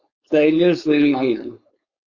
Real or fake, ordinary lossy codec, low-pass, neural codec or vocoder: fake; Opus, 64 kbps; 7.2 kHz; codec, 16 kHz, 4.8 kbps, FACodec